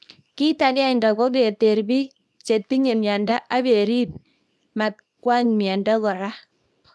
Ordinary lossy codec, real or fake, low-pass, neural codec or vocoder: none; fake; none; codec, 24 kHz, 0.9 kbps, WavTokenizer, small release